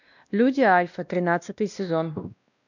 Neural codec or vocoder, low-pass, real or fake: codec, 16 kHz, 1 kbps, X-Codec, WavLM features, trained on Multilingual LibriSpeech; 7.2 kHz; fake